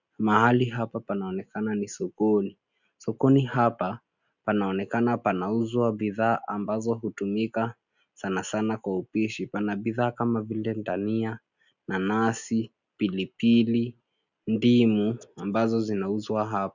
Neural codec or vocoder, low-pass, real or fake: none; 7.2 kHz; real